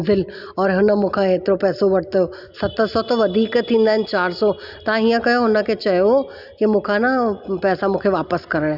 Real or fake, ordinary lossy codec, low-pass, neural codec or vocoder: real; Opus, 64 kbps; 5.4 kHz; none